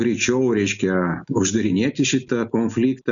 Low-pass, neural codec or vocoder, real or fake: 7.2 kHz; none; real